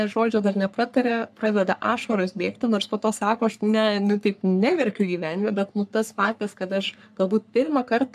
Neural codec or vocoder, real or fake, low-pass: codec, 44.1 kHz, 3.4 kbps, Pupu-Codec; fake; 14.4 kHz